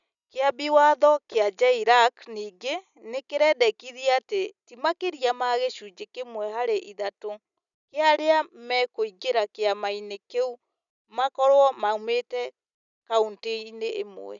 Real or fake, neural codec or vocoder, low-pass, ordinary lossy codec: real; none; 7.2 kHz; none